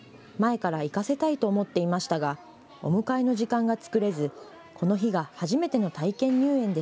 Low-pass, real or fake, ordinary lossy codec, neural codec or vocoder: none; real; none; none